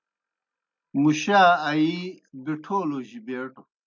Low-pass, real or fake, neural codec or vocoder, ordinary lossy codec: 7.2 kHz; real; none; MP3, 64 kbps